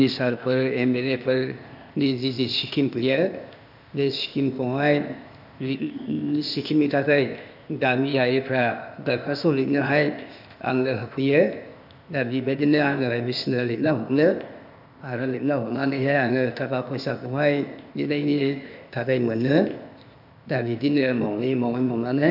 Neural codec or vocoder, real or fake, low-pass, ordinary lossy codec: codec, 16 kHz, 0.8 kbps, ZipCodec; fake; 5.4 kHz; none